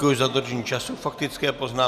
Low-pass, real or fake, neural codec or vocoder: 14.4 kHz; real; none